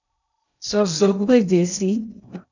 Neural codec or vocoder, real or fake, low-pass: codec, 16 kHz in and 24 kHz out, 0.6 kbps, FocalCodec, streaming, 2048 codes; fake; 7.2 kHz